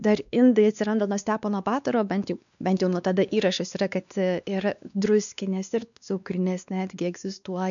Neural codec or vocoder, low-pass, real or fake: codec, 16 kHz, 2 kbps, X-Codec, WavLM features, trained on Multilingual LibriSpeech; 7.2 kHz; fake